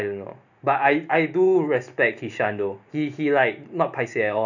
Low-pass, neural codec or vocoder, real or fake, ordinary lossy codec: 7.2 kHz; none; real; none